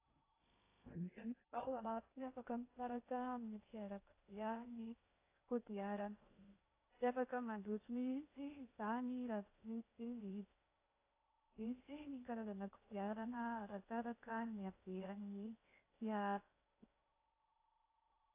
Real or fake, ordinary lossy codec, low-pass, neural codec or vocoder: fake; AAC, 24 kbps; 3.6 kHz; codec, 16 kHz in and 24 kHz out, 0.6 kbps, FocalCodec, streaming, 2048 codes